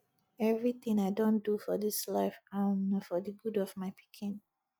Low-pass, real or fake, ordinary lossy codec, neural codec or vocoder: none; real; none; none